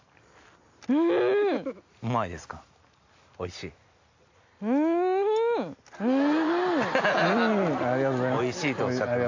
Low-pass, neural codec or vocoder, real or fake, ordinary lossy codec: 7.2 kHz; none; real; AAC, 48 kbps